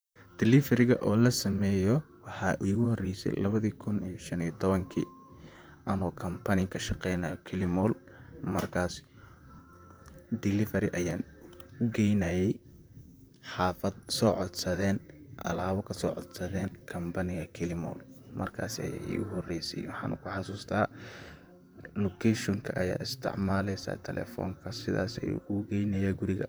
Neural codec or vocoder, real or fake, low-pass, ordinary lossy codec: vocoder, 44.1 kHz, 128 mel bands, Pupu-Vocoder; fake; none; none